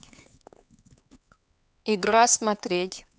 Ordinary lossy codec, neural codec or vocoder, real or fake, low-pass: none; codec, 16 kHz, 4 kbps, X-Codec, HuBERT features, trained on LibriSpeech; fake; none